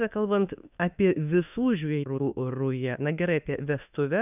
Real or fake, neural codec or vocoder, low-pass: fake; autoencoder, 48 kHz, 32 numbers a frame, DAC-VAE, trained on Japanese speech; 3.6 kHz